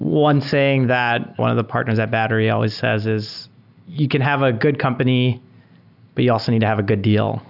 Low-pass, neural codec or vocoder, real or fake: 5.4 kHz; none; real